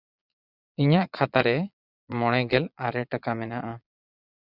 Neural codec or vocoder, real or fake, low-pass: vocoder, 22.05 kHz, 80 mel bands, Vocos; fake; 5.4 kHz